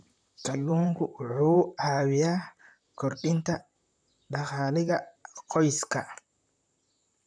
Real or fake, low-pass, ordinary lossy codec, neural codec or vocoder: fake; 9.9 kHz; none; vocoder, 44.1 kHz, 128 mel bands, Pupu-Vocoder